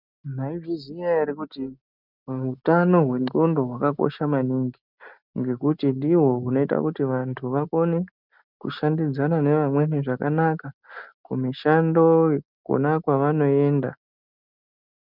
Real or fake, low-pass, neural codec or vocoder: real; 5.4 kHz; none